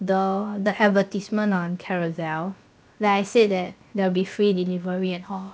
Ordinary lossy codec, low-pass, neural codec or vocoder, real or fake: none; none; codec, 16 kHz, about 1 kbps, DyCAST, with the encoder's durations; fake